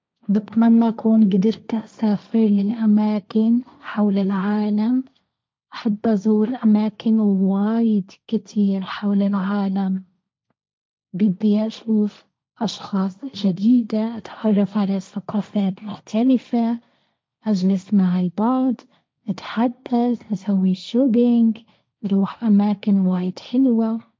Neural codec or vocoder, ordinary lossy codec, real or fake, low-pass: codec, 16 kHz, 1.1 kbps, Voila-Tokenizer; none; fake; none